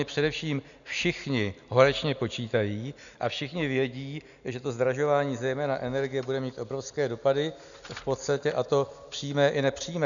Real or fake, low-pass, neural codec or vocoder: real; 7.2 kHz; none